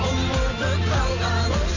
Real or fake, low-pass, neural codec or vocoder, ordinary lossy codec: real; 7.2 kHz; none; AAC, 48 kbps